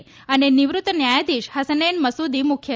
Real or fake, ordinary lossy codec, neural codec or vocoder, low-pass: real; none; none; none